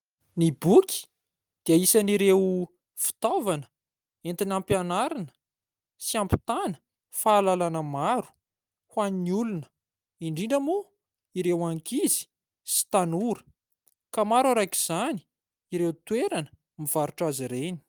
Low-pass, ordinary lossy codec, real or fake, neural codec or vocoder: 19.8 kHz; Opus, 32 kbps; real; none